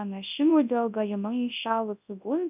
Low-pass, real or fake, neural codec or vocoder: 3.6 kHz; fake; codec, 24 kHz, 0.9 kbps, WavTokenizer, large speech release